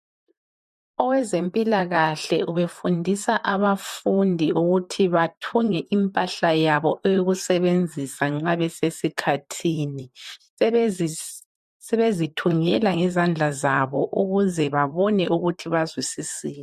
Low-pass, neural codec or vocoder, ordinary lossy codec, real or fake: 14.4 kHz; vocoder, 44.1 kHz, 128 mel bands, Pupu-Vocoder; MP3, 64 kbps; fake